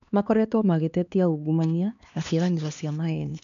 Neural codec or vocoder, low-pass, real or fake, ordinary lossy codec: codec, 16 kHz, 2 kbps, X-Codec, HuBERT features, trained on LibriSpeech; 7.2 kHz; fake; none